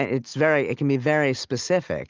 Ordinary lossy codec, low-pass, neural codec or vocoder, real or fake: Opus, 24 kbps; 7.2 kHz; none; real